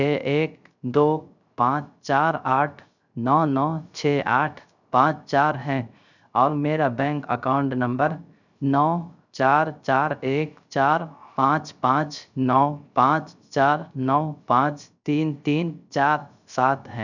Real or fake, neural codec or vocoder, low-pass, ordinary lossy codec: fake; codec, 16 kHz, 0.3 kbps, FocalCodec; 7.2 kHz; none